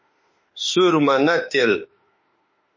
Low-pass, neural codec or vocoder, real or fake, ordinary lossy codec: 7.2 kHz; autoencoder, 48 kHz, 32 numbers a frame, DAC-VAE, trained on Japanese speech; fake; MP3, 32 kbps